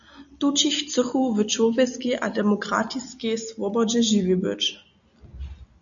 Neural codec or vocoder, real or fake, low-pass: none; real; 7.2 kHz